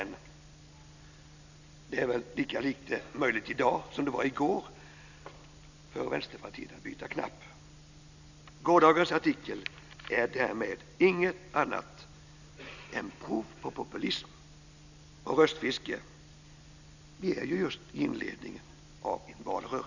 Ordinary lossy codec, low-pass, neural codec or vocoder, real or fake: none; 7.2 kHz; none; real